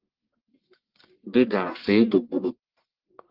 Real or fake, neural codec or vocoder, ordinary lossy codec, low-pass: fake; codec, 44.1 kHz, 1.7 kbps, Pupu-Codec; Opus, 16 kbps; 5.4 kHz